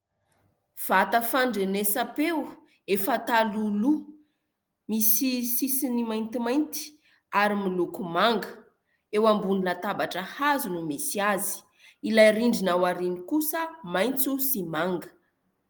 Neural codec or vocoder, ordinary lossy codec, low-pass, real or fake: none; Opus, 24 kbps; 19.8 kHz; real